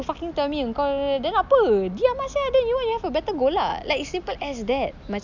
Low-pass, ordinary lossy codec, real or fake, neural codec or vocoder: 7.2 kHz; none; real; none